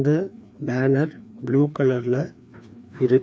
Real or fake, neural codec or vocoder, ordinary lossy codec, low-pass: fake; codec, 16 kHz, 2 kbps, FreqCodec, larger model; none; none